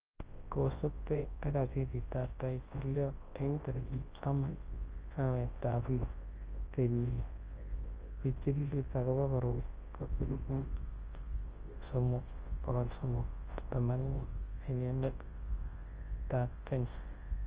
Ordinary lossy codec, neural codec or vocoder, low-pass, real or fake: Opus, 16 kbps; codec, 24 kHz, 0.9 kbps, WavTokenizer, large speech release; 3.6 kHz; fake